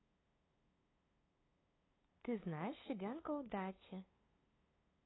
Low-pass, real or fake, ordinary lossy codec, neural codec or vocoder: 7.2 kHz; fake; AAC, 16 kbps; codec, 16 kHz, 2 kbps, FunCodec, trained on LibriTTS, 25 frames a second